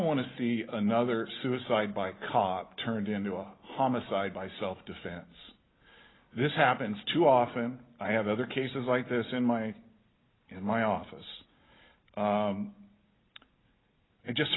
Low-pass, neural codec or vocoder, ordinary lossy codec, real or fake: 7.2 kHz; none; AAC, 16 kbps; real